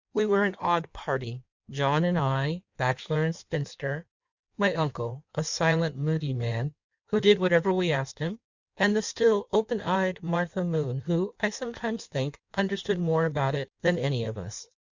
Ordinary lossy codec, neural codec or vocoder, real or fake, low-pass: Opus, 64 kbps; codec, 16 kHz in and 24 kHz out, 1.1 kbps, FireRedTTS-2 codec; fake; 7.2 kHz